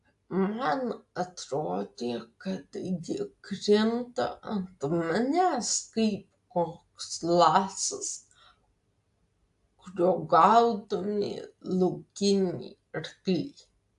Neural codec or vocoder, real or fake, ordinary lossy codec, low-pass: none; real; AAC, 64 kbps; 10.8 kHz